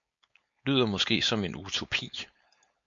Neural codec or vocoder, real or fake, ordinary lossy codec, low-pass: codec, 16 kHz, 4.8 kbps, FACodec; fake; MP3, 48 kbps; 7.2 kHz